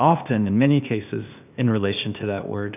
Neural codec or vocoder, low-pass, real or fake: codec, 16 kHz, 0.8 kbps, ZipCodec; 3.6 kHz; fake